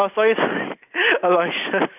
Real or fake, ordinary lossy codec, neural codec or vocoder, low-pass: real; none; none; 3.6 kHz